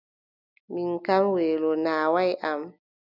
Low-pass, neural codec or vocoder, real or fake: 5.4 kHz; none; real